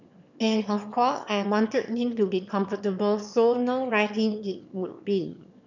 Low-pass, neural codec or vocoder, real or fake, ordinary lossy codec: 7.2 kHz; autoencoder, 22.05 kHz, a latent of 192 numbers a frame, VITS, trained on one speaker; fake; none